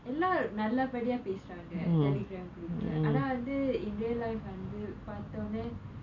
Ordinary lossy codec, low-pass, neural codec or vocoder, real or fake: none; 7.2 kHz; none; real